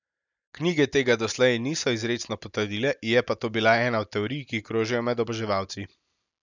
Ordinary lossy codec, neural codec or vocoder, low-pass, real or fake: none; none; 7.2 kHz; real